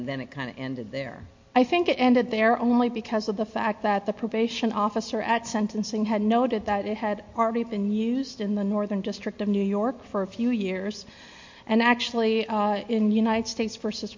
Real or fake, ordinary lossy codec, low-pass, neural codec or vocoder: real; MP3, 48 kbps; 7.2 kHz; none